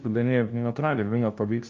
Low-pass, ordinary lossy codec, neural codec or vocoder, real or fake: 7.2 kHz; Opus, 24 kbps; codec, 16 kHz, 0.5 kbps, FunCodec, trained on LibriTTS, 25 frames a second; fake